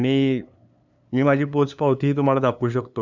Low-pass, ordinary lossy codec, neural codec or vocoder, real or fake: 7.2 kHz; none; codec, 16 kHz, 2 kbps, FunCodec, trained on LibriTTS, 25 frames a second; fake